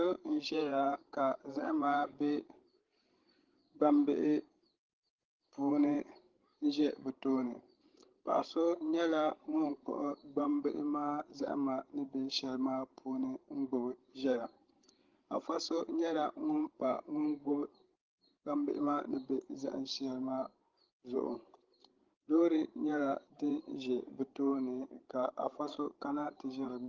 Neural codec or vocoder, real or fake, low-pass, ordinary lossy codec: codec, 16 kHz, 16 kbps, FreqCodec, larger model; fake; 7.2 kHz; Opus, 16 kbps